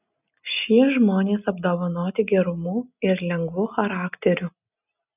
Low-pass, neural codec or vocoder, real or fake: 3.6 kHz; none; real